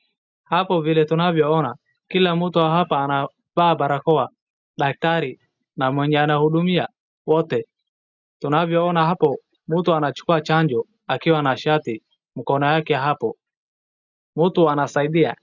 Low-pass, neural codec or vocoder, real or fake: 7.2 kHz; none; real